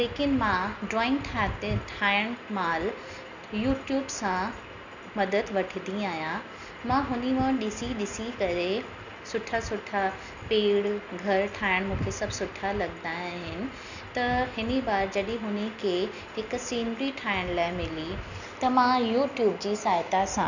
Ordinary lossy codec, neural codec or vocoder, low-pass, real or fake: none; none; 7.2 kHz; real